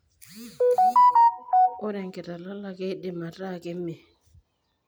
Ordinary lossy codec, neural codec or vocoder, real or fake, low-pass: none; none; real; none